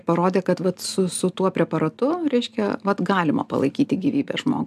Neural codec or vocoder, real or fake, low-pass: none; real; 14.4 kHz